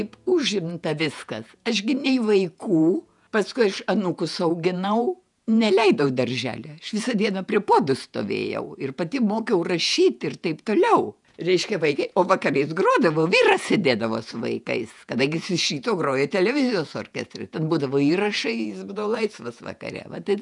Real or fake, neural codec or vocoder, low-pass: fake; vocoder, 48 kHz, 128 mel bands, Vocos; 10.8 kHz